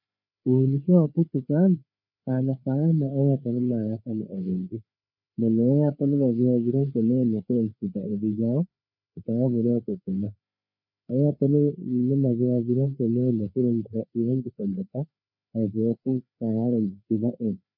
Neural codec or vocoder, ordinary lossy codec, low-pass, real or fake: codec, 16 kHz, 4 kbps, FreqCodec, larger model; MP3, 48 kbps; 5.4 kHz; fake